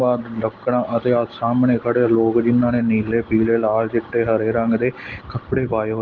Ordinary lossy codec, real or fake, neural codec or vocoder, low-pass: Opus, 16 kbps; real; none; 7.2 kHz